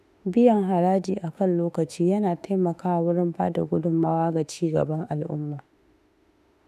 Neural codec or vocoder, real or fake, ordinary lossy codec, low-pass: autoencoder, 48 kHz, 32 numbers a frame, DAC-VAE, trained on Japanese speech; fake; none; 14.4 kHz